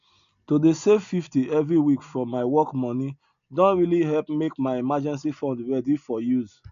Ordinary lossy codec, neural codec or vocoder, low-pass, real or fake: none; none; 7.2 kHz; real